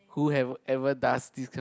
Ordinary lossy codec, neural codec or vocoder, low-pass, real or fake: none; none; none; real